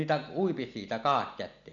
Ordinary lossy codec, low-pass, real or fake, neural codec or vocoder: none; 7.2 kHz; real; none